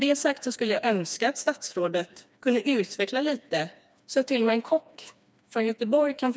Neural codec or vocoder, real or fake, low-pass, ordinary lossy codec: codec, 16 kHz, 2 kbps, FreqCodec, smaller model; fake; none; none